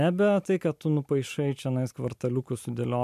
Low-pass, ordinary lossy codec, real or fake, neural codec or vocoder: 14.4 kHz; MP3, 96 kbps; real; none